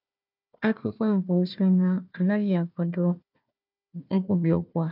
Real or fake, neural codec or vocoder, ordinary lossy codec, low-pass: fake; codec, 16 kHz, 1 kbps, FunCodec, trained on Chinese and English, 50 frames a second; none; 5.4 kHz